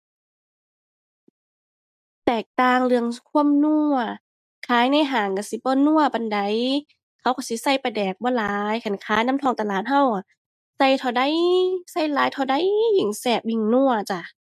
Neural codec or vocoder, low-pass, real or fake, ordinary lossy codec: none; 14.4 kHz; real; none